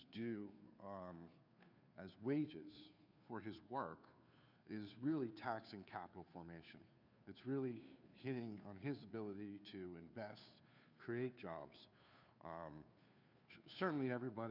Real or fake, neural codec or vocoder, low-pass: fake; codec, 16 kHz, 2 kbps, FunCodec, trained on Chinese and English, 25 frames a second; 5.4 kHz